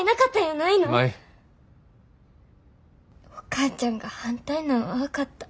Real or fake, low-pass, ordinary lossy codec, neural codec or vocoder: real; none; none; none